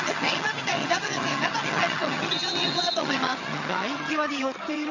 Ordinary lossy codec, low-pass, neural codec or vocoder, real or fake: none; 7.2 kHz; vocoder, 22.05 kHz, 80 mel bands, HiFi-GAN; fake